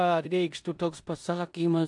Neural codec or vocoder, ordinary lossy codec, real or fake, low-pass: codec, 16 kHz in and 24 kHz out, 0.9 kbps, LongCat-Audio-Codec, four codebook decoder; AAC, 64 kbps; fake; 10.8 kHz